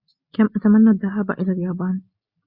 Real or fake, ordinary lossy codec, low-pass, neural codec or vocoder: real; Opus, 64 kbps; 5.4 kHz; none